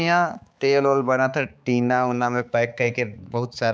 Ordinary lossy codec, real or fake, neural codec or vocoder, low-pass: none; fake; codec, 16 kHz, 4 kbps, X-Codec, HuBERT features, trained on balanced general audio; none